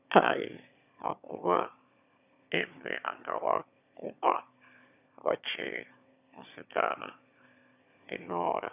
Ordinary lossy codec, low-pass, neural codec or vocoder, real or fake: none; 3.6 kHz; autoencoder, 22.05 kHz, a latent of 192 numbers a frame, VITS, trained on one speaker; fake